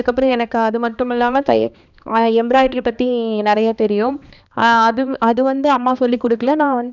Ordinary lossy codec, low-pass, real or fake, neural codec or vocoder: none; 7.2 kHz; fake; codec, 16 kHz, 2 kbps, X-Codec, HuBERT features, trained on balanced general audio